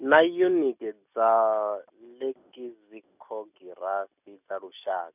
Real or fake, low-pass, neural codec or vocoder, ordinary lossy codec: real; 3.6 kHz; none; none